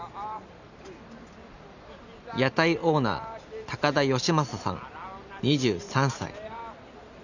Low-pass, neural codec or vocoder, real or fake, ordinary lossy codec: 7.2 kHz; none; real; none